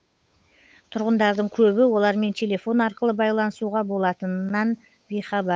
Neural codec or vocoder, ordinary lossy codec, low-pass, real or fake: codec, 16 kHz, 8 kbps, FunCodec, trained on Chinese and English, 25 frames a second; none; none; fake